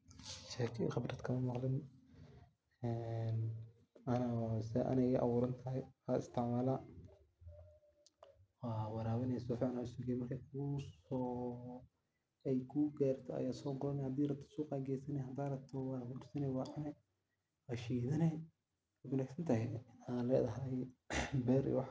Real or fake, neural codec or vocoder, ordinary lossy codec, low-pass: real; none; none; none